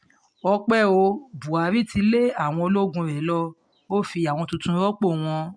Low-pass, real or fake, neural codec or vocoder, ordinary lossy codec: 9.9 kHz; real; none; MP3, 64 kbps